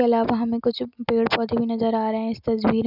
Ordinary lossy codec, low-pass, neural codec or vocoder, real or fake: none; 5.4 kHz; none; real